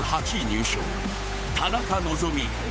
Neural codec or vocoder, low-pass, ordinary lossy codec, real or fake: codec, 16 kHz, 8 kbps, FunCodec, trained on Chinese and English, 25 frames a second; none; none; fake